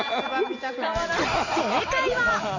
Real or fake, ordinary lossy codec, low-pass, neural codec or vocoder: real; none; 7.2 kHz; none